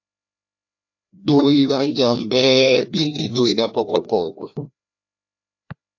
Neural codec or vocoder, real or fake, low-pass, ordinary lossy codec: codec, 16 kHz, 1 kbps, FreqCodec, larger model; fake; 7.2 kHz; AAC, 48 kbps